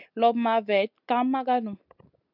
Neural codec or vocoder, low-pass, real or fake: none; 5.4 kHz; real